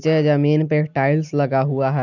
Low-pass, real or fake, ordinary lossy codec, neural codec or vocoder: 7.2 kHz; real; none; none